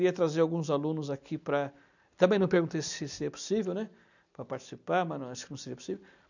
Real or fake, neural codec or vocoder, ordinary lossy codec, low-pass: real; none; none; 7.2 kHz